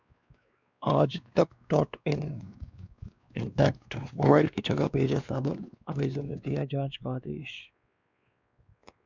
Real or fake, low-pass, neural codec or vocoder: fake; 7.2 kHz; codec, 16 kHz, 2 kbps, X-Codec, WavLM features, trained on Multilingual LibriSpeech